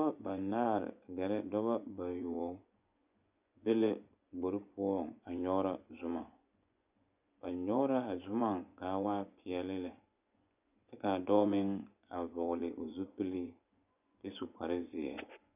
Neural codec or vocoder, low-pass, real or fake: vocoder, 24 kHz, 100 mel bands, Vocos; 3.6 kHz; fake